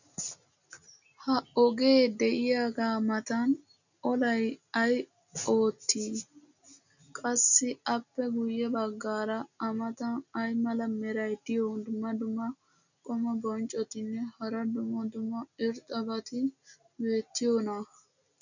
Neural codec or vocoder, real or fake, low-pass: none; real; 7.2 kHz